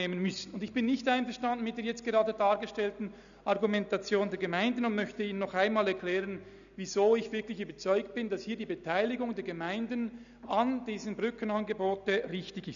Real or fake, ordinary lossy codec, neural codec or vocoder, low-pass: real; none; none; 7.2 kHz